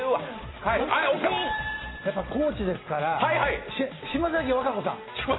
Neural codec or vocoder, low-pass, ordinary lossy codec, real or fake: none; 7.2 kHz; AAC, 16 kbps; real